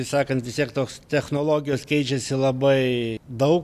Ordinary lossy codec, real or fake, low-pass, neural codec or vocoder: MP3, 96 kbps; real; 14.4 kHz; none